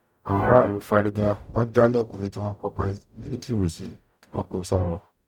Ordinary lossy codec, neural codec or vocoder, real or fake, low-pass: none; codec, 44.1 kHz, 0.9 kbps, DAC; fake; 19.8 kHz